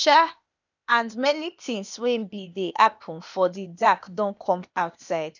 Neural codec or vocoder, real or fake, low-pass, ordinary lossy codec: codec, 16 kHz, 0.8 kbps, ZipCodec; fake; 7.2 kHz; none